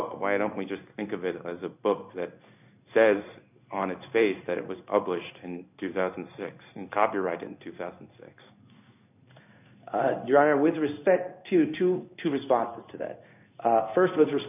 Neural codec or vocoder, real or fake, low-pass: codec, 16 kHz in and 24 kHz out, 1 kbps, XY-Tokenizer; fake; 3.6 kHz